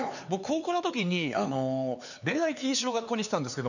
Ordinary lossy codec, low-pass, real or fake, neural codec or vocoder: none; 7.2 kHz; fake; codec, 16 kHz, 4 kbps, X-Codec, HuBERT features, trained on LibriSpeech